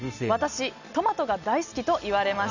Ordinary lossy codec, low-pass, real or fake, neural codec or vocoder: none; 7.2 kHz; real; none